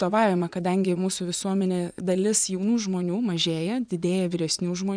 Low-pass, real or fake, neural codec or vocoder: 9.9 kHz; fake; vocoder, 24 kHz, 100 mel bands, Vocos